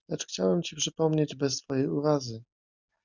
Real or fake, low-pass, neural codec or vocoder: real; 7.2 kHz; none